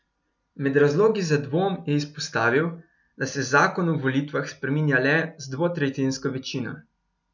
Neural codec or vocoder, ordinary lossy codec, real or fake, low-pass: none; none; real; 7.2 kHz